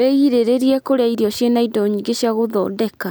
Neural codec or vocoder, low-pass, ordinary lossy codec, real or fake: none; none; none; real